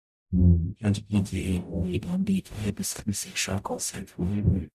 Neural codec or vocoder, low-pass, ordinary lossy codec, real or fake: codec, 44.1 kHz, 0.9 kbps, DAC; 14.4 kHz; none; fake